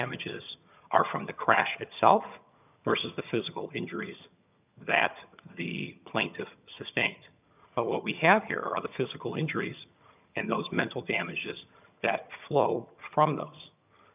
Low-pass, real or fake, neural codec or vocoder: 3.6 kHz; fake; vocoder, 22.05 kHz, 80 mel bands, HiFi-GAN